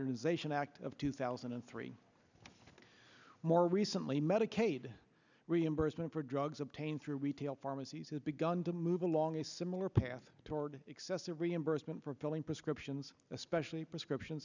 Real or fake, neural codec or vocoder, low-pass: real; none; 7.2 kHz